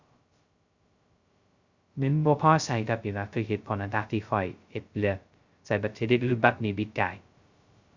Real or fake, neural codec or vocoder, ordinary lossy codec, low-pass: fake; codec, 16 kHz, 0.2 kbps, FocalCodec; Opus, 64 kbps; 7.2 kHz